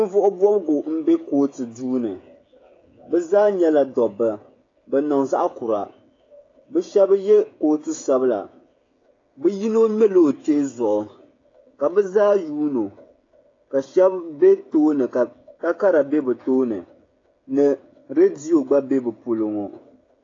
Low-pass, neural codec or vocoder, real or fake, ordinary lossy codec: 7.2 kHz; codec, 16 kHz, 16 kbps, FreqCodec, smaller model; fake; AAC, 32 kbps